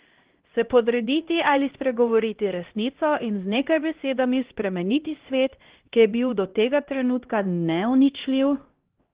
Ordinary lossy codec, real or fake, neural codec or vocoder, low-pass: Opus, 16 kbps; fake; codec, 16 kHz, 1 kbps, X-Codec, HuBERT features, trained on LibriSpeech; 3.6 kHz